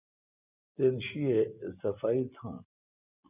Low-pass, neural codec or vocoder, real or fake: 3.6 kHz; none; real